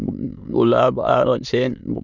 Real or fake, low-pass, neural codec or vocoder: fake; 7.2 kHz; autoencoder, 22.05 kHz, a latent of 192 numbers a frame, VITS, trained on many speakers